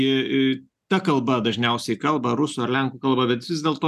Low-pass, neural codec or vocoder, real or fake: 14.4 kHz; none; real